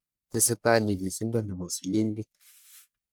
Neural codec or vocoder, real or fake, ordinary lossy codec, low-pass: codec, 44.1 kHz, 1.7 kbps, Pupu-Codec; fake; none; none